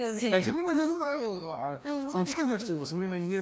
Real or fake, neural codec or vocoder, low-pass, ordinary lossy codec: fake; codec, 16 kHz, 1 kbps, FreqCodec, larger model; none; none